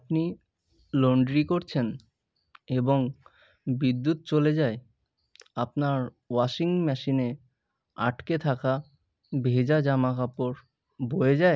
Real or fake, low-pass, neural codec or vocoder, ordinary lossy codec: real; none; none; none